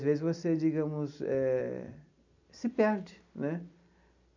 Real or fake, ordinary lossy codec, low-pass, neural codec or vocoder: real; none; 7.2 kHz; none